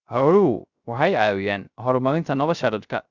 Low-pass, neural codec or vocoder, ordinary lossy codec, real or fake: 7.2 kHz; codec, 16 kHz, 0.3 kbps, FocalCodec; Opus, 64 kbps; fake